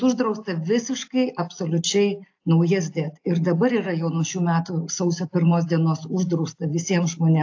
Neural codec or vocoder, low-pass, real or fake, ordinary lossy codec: none; 7.2 kHz; real; AAC, 48 kbps